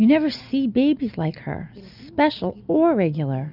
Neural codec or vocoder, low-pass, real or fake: none; 5.4 kHz; real